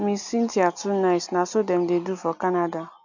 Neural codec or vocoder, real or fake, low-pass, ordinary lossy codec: none; real; 7.2 kHz; none